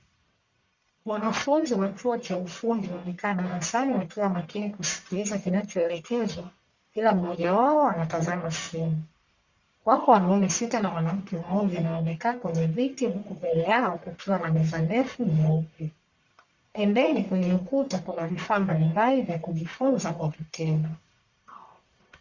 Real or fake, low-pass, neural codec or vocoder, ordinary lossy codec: fake; 7.2 kHz; codec, 44.1 kHz, 1.7 kbps, Pupu-Codec; Opus, 64 kbps